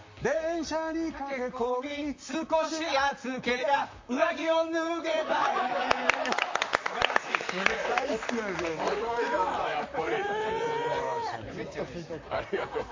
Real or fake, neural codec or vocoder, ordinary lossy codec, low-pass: fake; vocoder, 44.1 kHz, 128 mel bands, Pupu-Vocoder; MP3, 48 kbps; 7.2 kHz